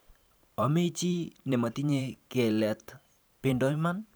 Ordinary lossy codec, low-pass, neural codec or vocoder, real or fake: none; none; vocoder, 44.1 kHz, 128 mel bands every 512 samples, BigVGAN v2; fake